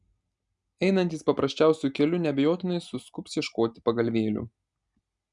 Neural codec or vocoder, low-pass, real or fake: none; 10.8 kHz; real